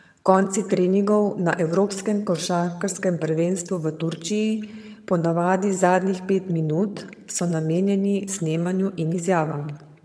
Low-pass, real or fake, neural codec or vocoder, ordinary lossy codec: none; fake; vocoder, 22.05 kHz, 80 mel bands, HiFi-GAN; none